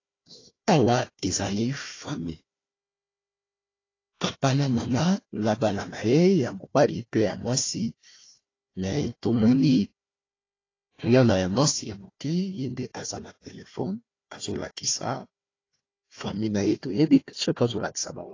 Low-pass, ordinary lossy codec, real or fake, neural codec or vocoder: 7.2 kHz; AAC, 32 kbps; fake; codec, 16 kHz, 1 kbps, FunCodec, trained on Chinese and English, 50 frames a second